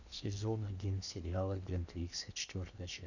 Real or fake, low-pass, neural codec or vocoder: fake; 7.2 kHz; codec, 16 kHz in and 24 kHz out, 0.8 kbps, FocalCodec, streaming, 65536 codes